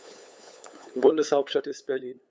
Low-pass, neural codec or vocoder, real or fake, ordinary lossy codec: none; codec, 16 kHz, 8 kbps, FunCodec, trained on LibriTTS, 25 frames a second; fake; none